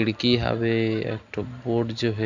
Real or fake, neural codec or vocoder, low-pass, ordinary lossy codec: real; none; 7.2 kHz; none